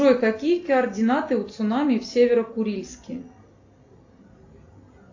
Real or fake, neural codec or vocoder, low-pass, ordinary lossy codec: real; none; 7.2 kHz; AAC, 48 kbps